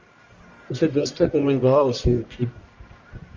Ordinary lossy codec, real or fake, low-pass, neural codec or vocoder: Opus, 32 kbps; fake; 7.2 kHz; codec, 44.1 kHz, 1.7 kbps, Pupu-Codec